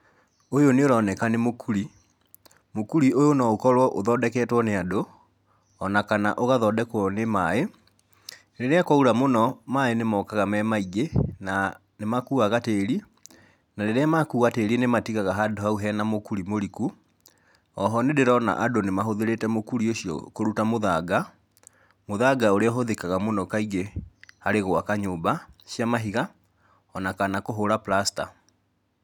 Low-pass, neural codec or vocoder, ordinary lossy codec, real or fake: 19.8 kHz; none; none; real